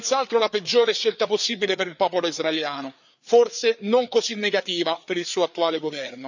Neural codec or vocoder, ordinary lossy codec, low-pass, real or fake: codec, 16 kHz, 4 kbps, FreqCodec, larger model; none; 7.2 kHz; fake